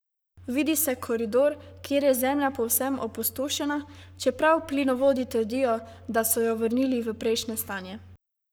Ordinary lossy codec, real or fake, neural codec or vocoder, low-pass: none; fake; codec, 44.1 kHz, 7.8 kbps, Pupu-Codec; none